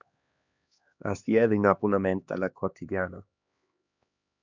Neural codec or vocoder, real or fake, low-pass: codec, 16 kHz, 2 kbps, X-Codec, HuBERT features, trained on LibriSpeech; fake; 7.2 kHz